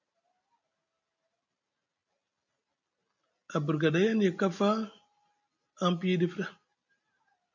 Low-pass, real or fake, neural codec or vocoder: 7.2 kHz; real; none